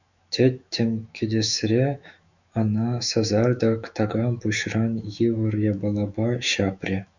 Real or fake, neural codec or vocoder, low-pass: fake; autoencoder, 48 kHz, 128 numbers a frame, DAC-VAE, trained on Japanese speech; 7.2 kHz